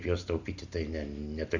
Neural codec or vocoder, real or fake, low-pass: none; real; 7.2 kHz